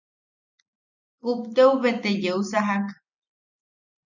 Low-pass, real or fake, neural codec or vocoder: 7.2 kHz; real; none